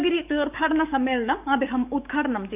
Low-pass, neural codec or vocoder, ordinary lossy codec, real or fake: 3.6 kHz; codec, 44.1 kHz, 7.8 kbps, DAC; none; fake